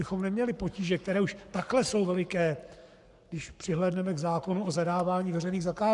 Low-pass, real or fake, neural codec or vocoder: 10.8 kHz; fake; codec, 44.1 kHz, 7.8 kbps, Pupu-Codec